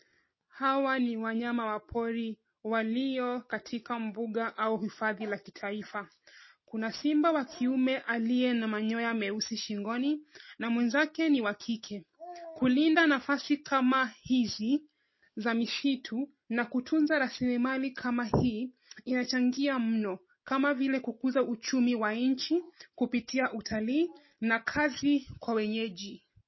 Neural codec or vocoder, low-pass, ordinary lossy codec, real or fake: none; 7.2 kHz; MP3, 24 kbps; real